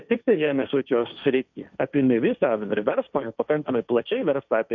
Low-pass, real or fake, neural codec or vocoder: 7.2 kHz; fake; codec, 16 kHz, 1.1 kbps, Voila-Tokenizer